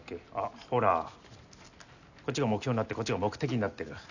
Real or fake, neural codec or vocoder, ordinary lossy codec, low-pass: real; none; none; 7.2 kHz